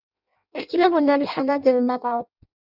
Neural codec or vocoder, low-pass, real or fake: codec, 16 kHz in and 24 kHz out, 0.6 kbps, FireRedTTS-2 codec; 5.4 kHz; fake